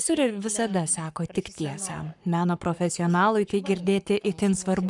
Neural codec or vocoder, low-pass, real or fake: codec, 44.1 kHz, 7.8 kbps, Pupu-Codec; 10.8 kHz; fake